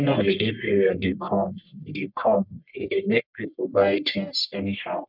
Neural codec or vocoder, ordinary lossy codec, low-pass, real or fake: codec, 44.1 kHz, 1.7 kbps, Pupu-Codec; none; 5.4 kHz; fake